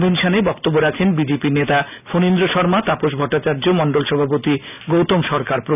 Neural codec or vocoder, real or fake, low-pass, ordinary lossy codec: none; real; 3.6 kHz; none